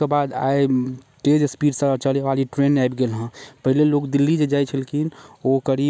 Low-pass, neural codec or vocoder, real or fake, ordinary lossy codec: none; none; real; none